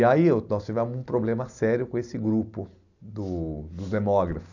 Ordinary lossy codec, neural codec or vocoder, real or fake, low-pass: none; none; real; 7.2 kHz